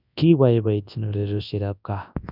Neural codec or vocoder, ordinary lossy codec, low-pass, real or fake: codec, 24 kHz, 0.9 kbps, WavTokenizer, large speech release; none; 5.4 kHz; fake